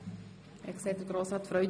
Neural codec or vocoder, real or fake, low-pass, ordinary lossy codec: none; real; none; none